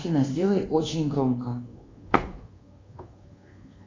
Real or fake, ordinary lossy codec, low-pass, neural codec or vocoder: fake; AAC, 32 kbps; 7.2 kHz; codec, 24 kHz, 1.2 kbps, DualCodec